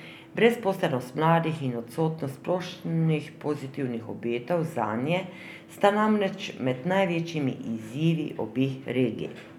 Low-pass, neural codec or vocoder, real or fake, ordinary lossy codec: 19.8 kHz; none; real; none